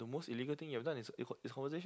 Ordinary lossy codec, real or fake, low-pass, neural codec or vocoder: none; real; none; none